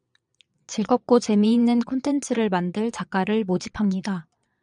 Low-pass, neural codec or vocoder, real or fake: 9.9 kHz; vocoder, 22.05 kHz, 80 mel bands, Vocos; fake